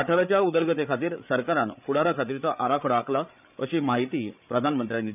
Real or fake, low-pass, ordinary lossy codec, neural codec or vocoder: fake; 3.6 kHz; none; codec, 16 kHz, 8 kbps, FreqCodec, larger model